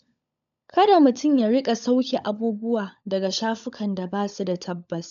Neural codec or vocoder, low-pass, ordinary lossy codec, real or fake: codec, 16 kHz, 16 kbps, FunCodec, trained on LibriTTS, 50 frames a second; 7.2 kHz; none; fake